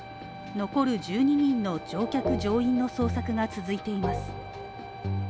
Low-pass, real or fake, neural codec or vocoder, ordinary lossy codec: none; real; none; none